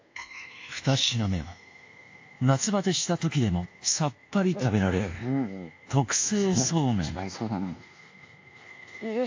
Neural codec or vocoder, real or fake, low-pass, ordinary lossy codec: codec, 24 kHz, 1.2 kbps, DualCodec; fake; 7.2 kHz; AAC, 48 kbps